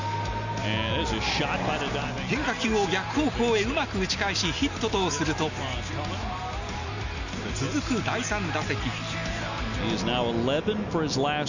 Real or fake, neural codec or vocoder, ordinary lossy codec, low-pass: real; none; none; 7.2 kHz